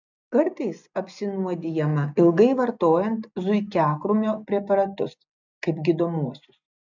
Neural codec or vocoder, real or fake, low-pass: none; real; 7.2 kHz